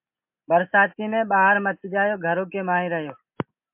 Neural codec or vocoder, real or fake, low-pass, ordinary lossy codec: none; real; 3.6 kHz; MP3, 32 kbps